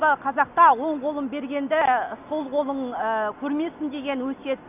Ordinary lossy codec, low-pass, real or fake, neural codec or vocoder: none; 3.6 kHz; real; none